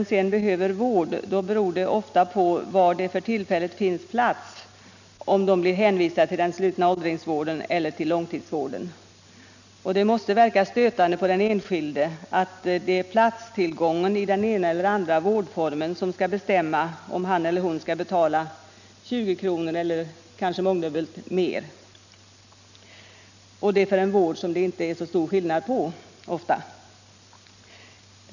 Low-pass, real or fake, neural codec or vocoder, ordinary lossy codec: 7.2 kHz; real; none; none